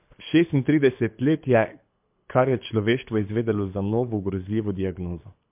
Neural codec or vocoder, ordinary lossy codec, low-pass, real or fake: codec, 24 kHz, 6 kbps, HILCodec; MP3, 32 kbps; 3.6 kHz; fake